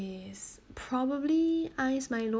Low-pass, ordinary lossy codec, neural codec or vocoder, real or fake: none; none; none; real